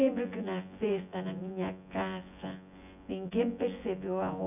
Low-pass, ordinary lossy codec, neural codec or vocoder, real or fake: 3.6 kHz; none; vocoder, 24 kHz, 100 mel bands, Vocos; fake